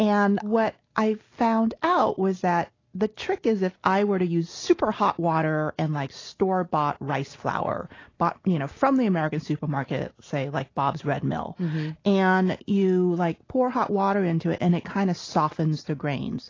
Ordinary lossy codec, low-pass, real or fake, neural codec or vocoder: AAC, 32 kbps; 7.2 kHz; real; none